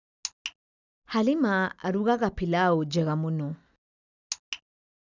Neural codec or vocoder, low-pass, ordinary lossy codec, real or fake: none; 7.2 kHz; none; real